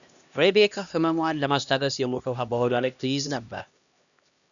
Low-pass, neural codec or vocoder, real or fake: 7.2 kHz; codec, 16 kHz, 1 kbps, X-Codec, HuBERT features, trained on LibriSpeech; fake